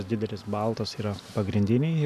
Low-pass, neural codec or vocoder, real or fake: 14.4 kHz; none; real